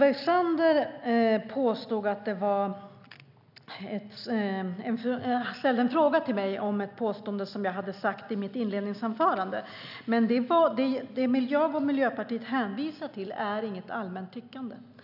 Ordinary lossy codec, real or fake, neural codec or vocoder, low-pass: none; real; none; 5.4 kHz